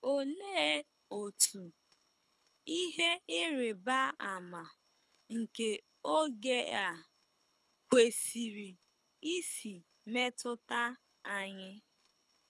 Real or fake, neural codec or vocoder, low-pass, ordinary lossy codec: fake; codec, 24 kHz, 6 kbps, HILCodec; none; none